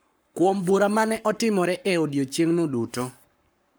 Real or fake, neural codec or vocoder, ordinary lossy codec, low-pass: fake; codec, 44.1 kHz, 7.8 kbps, Pupu-Codec; none; none